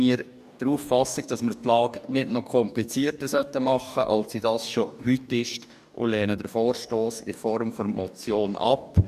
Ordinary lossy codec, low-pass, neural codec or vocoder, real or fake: none; 14.4 kHz; codec, 44.1 kHz, 2.6 kbps, DAC; fake